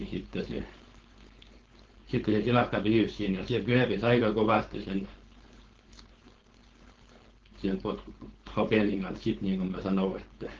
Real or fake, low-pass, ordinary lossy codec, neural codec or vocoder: fake; 7.2 kHz; Opus, 16 kbps; codec, 16 kHz, 4.8 kbps, FACodec